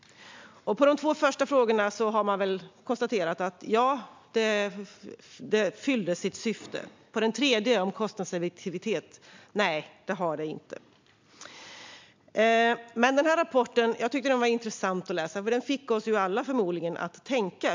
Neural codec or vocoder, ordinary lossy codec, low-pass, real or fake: none; MP3, 64 kbps; 7.2 kHz; real